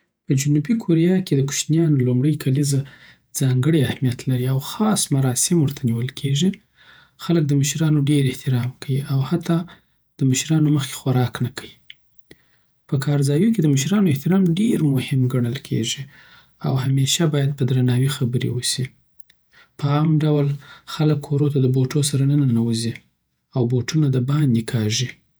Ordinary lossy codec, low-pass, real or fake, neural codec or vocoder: none; none; fake; vocoder, 48 kHz, 128 mel bands, Vocos